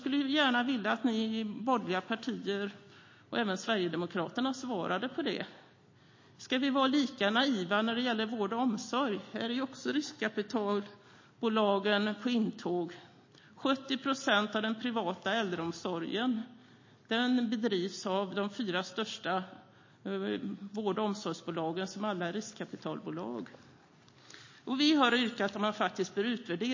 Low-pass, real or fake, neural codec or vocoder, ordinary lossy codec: 7.2 kHz; real; none; MP3, 32 kbps